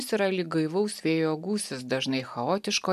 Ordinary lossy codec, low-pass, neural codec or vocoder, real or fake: AAC, 96 kbps; 14.4 kHz; none; real